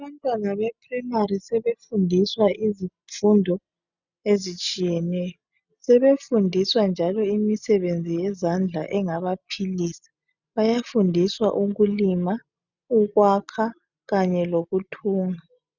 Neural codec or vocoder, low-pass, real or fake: none; 7.2 kHz; real